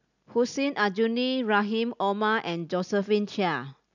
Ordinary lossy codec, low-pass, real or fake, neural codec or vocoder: none; 7.2 kHz; real; none